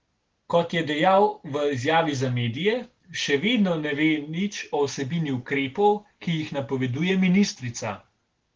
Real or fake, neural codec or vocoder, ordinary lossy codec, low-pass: real; none; Opus, 16 kbps; 7.2 kHz